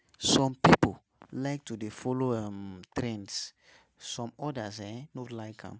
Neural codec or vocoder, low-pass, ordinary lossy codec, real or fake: none; none; none; real